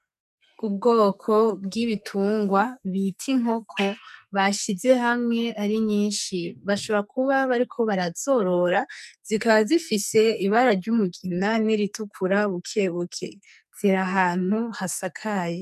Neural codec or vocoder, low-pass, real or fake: codec, 44.1 kHz, 2.6 kbps, SNAC; 14.4 kHz; fake